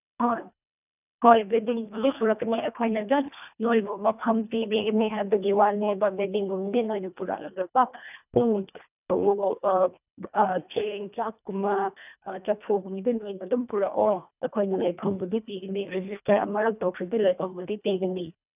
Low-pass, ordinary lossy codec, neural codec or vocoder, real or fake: 3.6 kHz; none; codec, 24 kHz, 1.5 kbps, HILCodec; fake